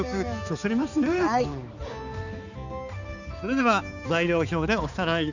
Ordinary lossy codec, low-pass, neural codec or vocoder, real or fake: none; 7.2 kHz; codec, 16 kHz, 4 kbps, X-Codec, HuBERT features, trained on balanced general audio; fake